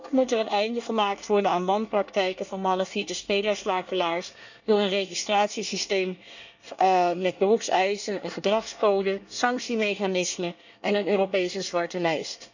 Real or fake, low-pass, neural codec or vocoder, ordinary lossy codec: fake; 7.2 kHz; codec, 24 kHz, 1 kbps, SNAC; none